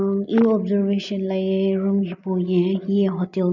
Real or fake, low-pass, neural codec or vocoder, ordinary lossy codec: real; 7.2 kHz; none; none